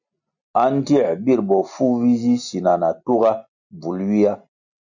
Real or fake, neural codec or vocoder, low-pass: real; none; 7.2 kHz